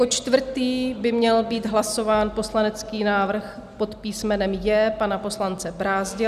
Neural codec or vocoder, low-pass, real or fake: none; 14.4 kHz; real